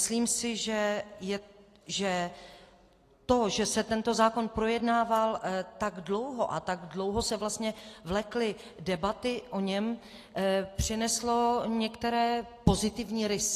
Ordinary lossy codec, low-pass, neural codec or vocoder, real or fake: AAC, 48 kbps; 14.4 kHz; none; real